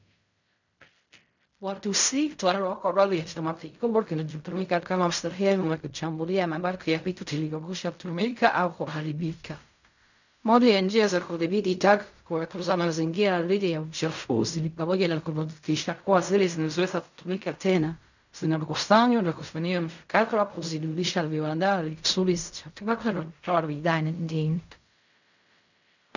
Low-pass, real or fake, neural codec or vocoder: 7.2 kHz; fake; codec, 16 kHz in and 24 kHz out, 0.4 kbps, LongCat-Audio-Codec, fine tuned four codebook decoder